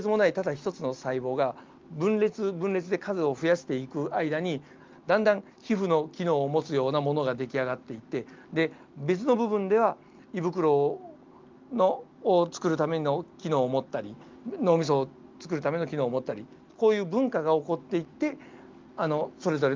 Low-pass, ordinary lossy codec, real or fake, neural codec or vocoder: 7.2 kHz; Opus, 32 kbps; real; none